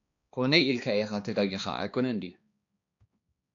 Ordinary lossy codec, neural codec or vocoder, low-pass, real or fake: AAC, 48 kbps; codec, 16 kHz, 2 kbps, X-Codec, HuBERT features, trained on balanced general audio; 7.2 kHz; fake